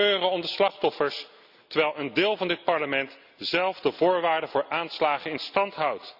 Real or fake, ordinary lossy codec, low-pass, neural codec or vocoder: real; none; 5.4 kHz; none